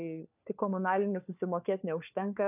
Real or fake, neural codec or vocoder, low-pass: fake; codec, 16 kHz, 4 kbps, FunCodec, trained on LibriTTS, 50 frames a second; 3.6 kHz